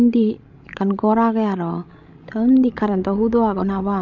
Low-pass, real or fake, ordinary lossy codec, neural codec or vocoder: 7.2 kHz; fake; none; codec, 16 kHz, 16 kbps, FreqCodec, larger model